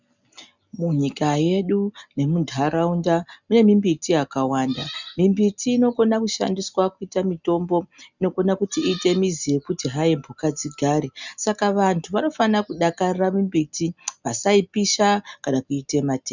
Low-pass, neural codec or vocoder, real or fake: 7.2 kHz; none; real